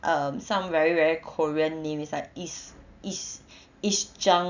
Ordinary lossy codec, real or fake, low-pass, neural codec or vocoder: none; real; 7.2 kHz; none